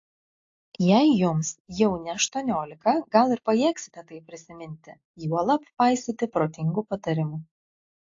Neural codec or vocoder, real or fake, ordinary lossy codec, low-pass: none; real; AAC, 48 kbps; 7.2 kHz